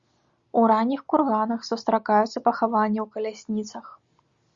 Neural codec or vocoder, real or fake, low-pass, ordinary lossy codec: none; real; 7.2 kHz; Opus, 64 kbps